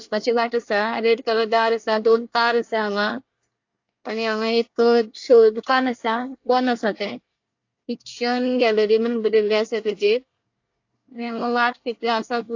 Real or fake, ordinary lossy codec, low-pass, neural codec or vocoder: fake; AAC, 48 kbps; 7.2 kHz; codec, 24 kHz, 1 kbps, SNAC